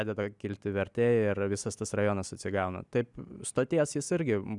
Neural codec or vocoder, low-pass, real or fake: none; 10.8 kHz; real